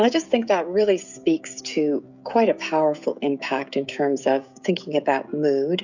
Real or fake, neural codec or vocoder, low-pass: fake; codec, 16 kHz, 16 kbps, FreqCodec, smaller model; 7.2 kHz